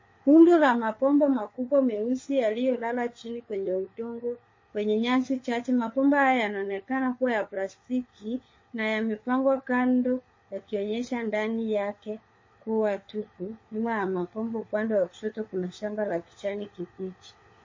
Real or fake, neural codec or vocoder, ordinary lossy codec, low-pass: fake; codec, 16 kHz, 4 kbps, FunCodec, trained on LibriTTS, 50 frames a second; MP3, 32 kbps; 7.2 kHz